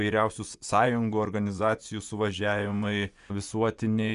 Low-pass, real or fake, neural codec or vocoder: 10.8 kHz; fake; vocoder, 24 kHz, 100 mel bands, Vocos